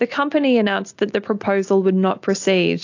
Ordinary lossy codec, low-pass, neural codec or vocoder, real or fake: AAC, 48 kbps; 7.2 kHz; none; real